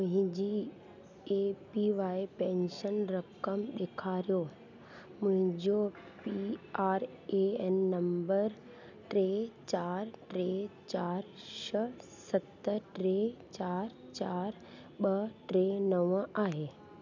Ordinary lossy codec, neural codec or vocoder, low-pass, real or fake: none; none; 7.2 kHz; real